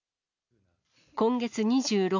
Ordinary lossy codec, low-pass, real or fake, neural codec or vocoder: none; 7.2 kHz; real; none